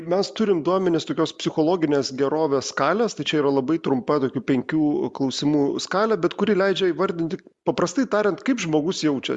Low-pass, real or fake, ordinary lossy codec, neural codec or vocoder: 10.8 kHz; real; Opus, 64 kbps; none